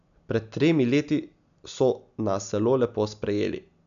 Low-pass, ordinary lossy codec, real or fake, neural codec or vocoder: 7.2 kHz; none; real; none